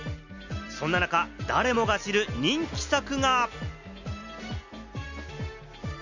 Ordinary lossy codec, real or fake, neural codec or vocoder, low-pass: Opus, 64 kbps; real; none; 7.2 kHz